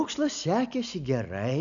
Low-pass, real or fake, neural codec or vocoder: 7.2 kHz; real; none